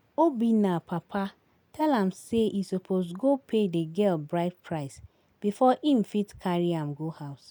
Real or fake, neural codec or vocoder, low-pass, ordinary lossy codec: real; none; none; none